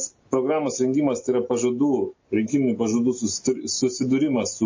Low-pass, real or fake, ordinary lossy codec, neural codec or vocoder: 7.2 kHz; real; MP3, 32 kbps; none